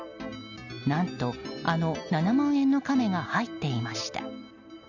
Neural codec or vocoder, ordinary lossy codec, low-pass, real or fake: none; none; 7.2 kHz; real